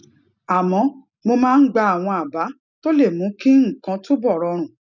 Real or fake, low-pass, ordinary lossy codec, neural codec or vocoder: real; 7.2 kHz; none; none